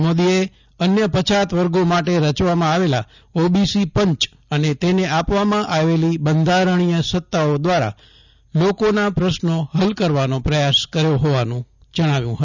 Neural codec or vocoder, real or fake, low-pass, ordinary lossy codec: none; real; 7.2 kHz; none